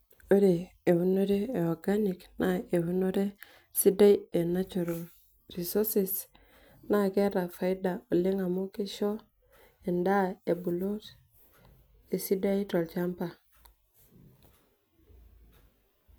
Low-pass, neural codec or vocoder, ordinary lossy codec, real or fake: none; none; none; real